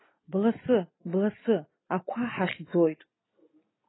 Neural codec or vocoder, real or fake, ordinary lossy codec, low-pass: none; real; AAC, 16 kbps; 7.2 kHz